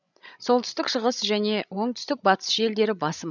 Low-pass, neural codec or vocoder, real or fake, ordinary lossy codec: 7.2 kHz; codec, 16 kHz, 16 kbps, FreqCodec, larger model; fake; none